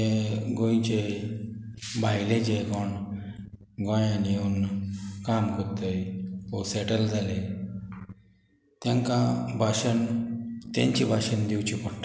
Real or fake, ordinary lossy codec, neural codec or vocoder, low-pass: real; none; none; none